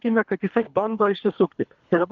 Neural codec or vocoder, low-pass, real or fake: codec, 24 kHz, 3 kbps, HILCodec; 7.2 kHz; fake